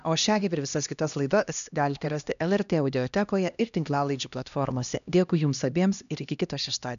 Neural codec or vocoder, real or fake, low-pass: codec, 16 kHz, 1 kbps, X-Codec, HuBERT features, trained on LibriSpeech; fake; 7.2 kHz